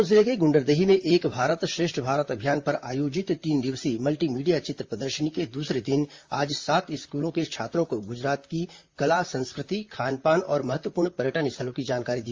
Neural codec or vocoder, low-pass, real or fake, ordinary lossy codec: vocoder, 44.1 kHz, 128 mel bands, Pupu-Vocoder; 7.2 kHz; fake; Opus, 32 kbps